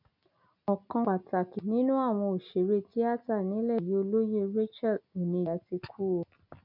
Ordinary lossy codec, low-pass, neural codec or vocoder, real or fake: none; 5.4 kHz; none; real